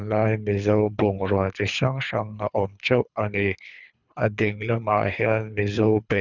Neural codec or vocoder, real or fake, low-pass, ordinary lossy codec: codec, 24 kHz, 3 kbps, HILCodec; fake; 7.2 kHz; none